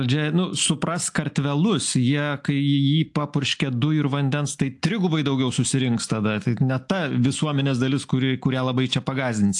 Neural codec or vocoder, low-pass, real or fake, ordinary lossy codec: none; 10.8 kHz; real; AAC, 64 kbps